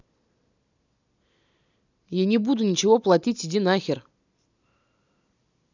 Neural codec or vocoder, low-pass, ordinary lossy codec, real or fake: none; 7.2 kHz; none; real